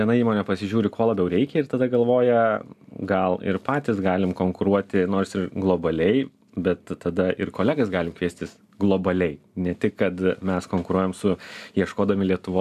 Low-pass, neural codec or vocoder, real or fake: 14.4 kHz; none; real